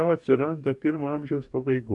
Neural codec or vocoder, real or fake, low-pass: codec, 44.1 kHz, 2.6 kbps, DAC; fake; 10.8 kHz